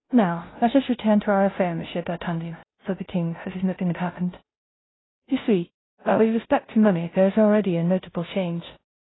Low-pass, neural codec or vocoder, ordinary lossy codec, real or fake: 7.2 kHz; codec, 16 kHz, 0.5 kbps, FunCodec, trained on Chinese and English, 25 frames a second; AAC, 16 kbps; fake